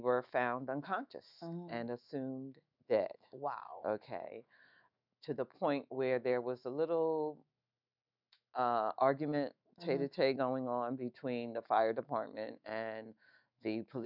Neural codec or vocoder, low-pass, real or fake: autoencoder, 48 kHz, 128 numbers a frame, DAC-VAE, trained on Japanese speech; 5.4 kHz; fake